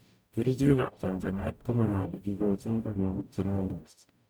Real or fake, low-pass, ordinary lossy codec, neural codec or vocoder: fake; none; none; codec, 44.1 kHz, 0.9 kbps, DAC